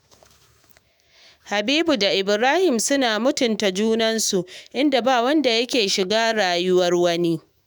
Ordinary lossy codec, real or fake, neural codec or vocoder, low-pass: none; fake; autoencoder, 48 kHz, 128 numbers a frame, DAC-VAE, trained on Japanese speech; none